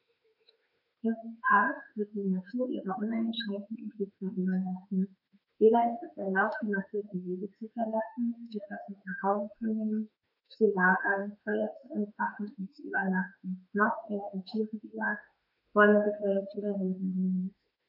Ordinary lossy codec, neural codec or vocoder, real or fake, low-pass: none; autoencoder, 48 kHz, 32 numbers a frame, DAC-VAE, trained on Japanese speech; fake; 5.4 kHz